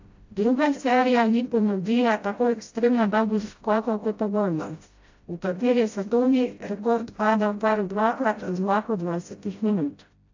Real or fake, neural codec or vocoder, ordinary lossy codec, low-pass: fake; codec, 16 kHz, 0.5 kbps, FreqCodec, smaller model; AAC, 48 kbps; 7.2 kHz